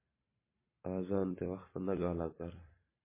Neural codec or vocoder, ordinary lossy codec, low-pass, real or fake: vocoder, 44.1 kHz, 128 mel bands every 512 samples, BigVGAN v2; MP3, 16 kbps; 3.6 kHz; fake